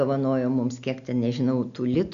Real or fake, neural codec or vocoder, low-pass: real; none; 7.2 kHz